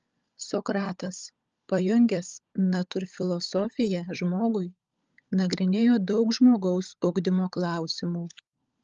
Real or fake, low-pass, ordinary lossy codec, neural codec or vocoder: fake; 7.2 kHz; Opus, 24 kbps; codec, 16 kHz, 16 kbps, FunCodec, trained on LibriTTS, 50 frames a second